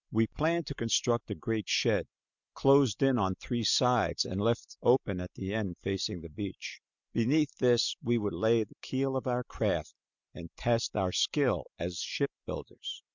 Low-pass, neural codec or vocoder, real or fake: 7.2 kHz; none; real